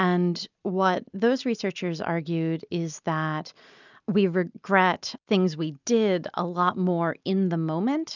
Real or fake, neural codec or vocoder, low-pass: real; none; 7.2 kHz